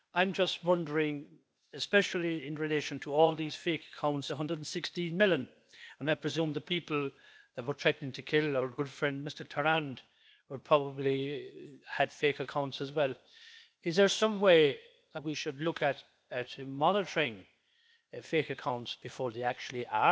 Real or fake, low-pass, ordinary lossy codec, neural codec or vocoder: fake; none; none; codec, 16 kHz, 0.8 kbps, ZipCodec